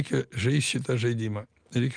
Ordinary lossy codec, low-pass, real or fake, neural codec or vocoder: Opus, 24 kbps; 9.9 kHz; real; none